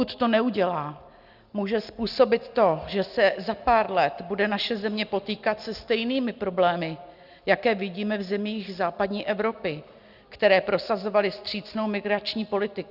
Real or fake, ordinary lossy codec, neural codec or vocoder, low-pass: real; Opus, 64 kbps; none; 5.4 kHz